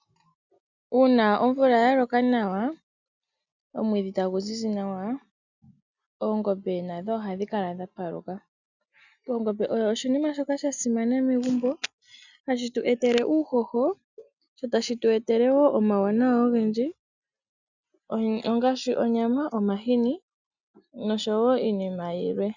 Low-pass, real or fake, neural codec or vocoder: 7.2 kHz; real; none